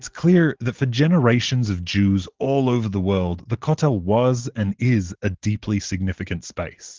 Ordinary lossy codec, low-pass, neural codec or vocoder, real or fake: Opus, 16 kbps; 7.2 kHz; none; real